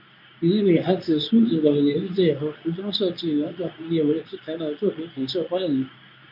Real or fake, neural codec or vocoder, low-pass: fake; codec, 24 kHz, 0.9 kbps, WavTokenizer, medium speech release version 2; 5.4 kHz